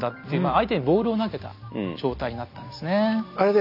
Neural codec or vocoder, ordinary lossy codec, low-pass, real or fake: none; none; 5.4 kHz; real